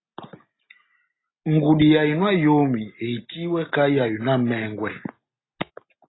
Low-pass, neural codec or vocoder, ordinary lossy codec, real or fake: 7.2 kHz; none; AAC, 16 kbps; real